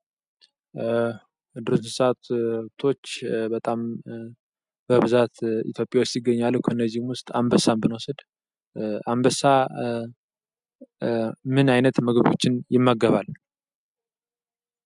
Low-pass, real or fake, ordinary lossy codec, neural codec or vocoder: 10.8 kHz; real; MP3, 96 kbps; none